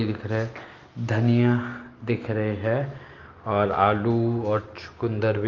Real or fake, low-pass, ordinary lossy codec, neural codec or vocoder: real; 7.2 kHz; Opus, 32 kbps; none